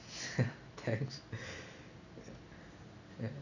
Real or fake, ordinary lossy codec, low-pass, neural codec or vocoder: real; none; 7.2 kHz; none